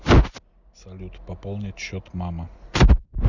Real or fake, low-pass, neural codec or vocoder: real; 7.2 kHz; none